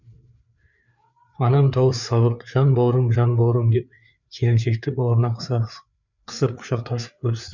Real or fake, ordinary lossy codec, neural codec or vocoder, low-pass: fake; none; codec, 16 kHz, 4 kbps, FreqCodec, larger model; 7.2 kHz